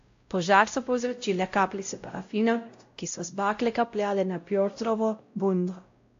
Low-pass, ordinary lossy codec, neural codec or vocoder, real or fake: 7.2 kHz; AAC, 48 kbps; codec, 16 kHz, 0.5 kbps, X-Codec, WavLM features, trained on Multilingual LibriSpeech; fake